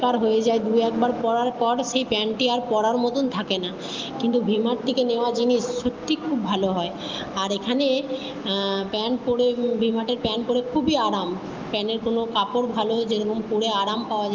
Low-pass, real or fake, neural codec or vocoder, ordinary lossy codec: 7.2 kHz; real; none; Opus, 24 kbps